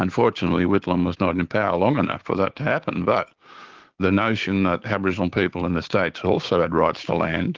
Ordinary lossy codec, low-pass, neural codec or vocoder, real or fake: Opus, 16 kbps; 7.2 kHz; codec, 16 kHz, 6 kbps, DAC; fake